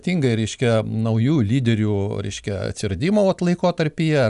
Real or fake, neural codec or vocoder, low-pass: real; none; 10.8 kHz